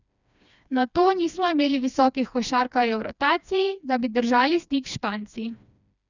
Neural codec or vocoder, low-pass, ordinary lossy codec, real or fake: codec, 16 kHz, 2 kbps, FreqCodec, smaller model; 7.2 kHz; none; fake